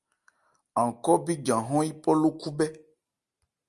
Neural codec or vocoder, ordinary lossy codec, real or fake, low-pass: none; Opus, 32 kbps; real; 10.8 kHz